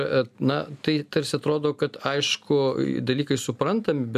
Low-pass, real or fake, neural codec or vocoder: 14.4 kHz; real; none